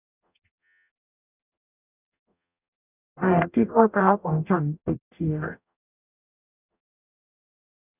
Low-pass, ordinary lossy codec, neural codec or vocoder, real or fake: 3.6 kHz; none; codec, 44.1 kHz, 0.9 kbps, DAC; fake